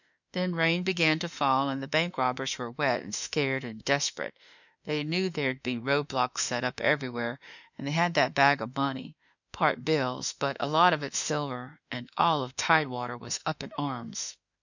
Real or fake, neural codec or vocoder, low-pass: fake; autoencoder, 48 kHz, 32 numbers a frame, DAC-VAE, trained on Japanese speech; 7.2 kHz